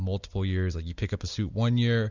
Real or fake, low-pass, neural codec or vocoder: real; 7.2 kHz; none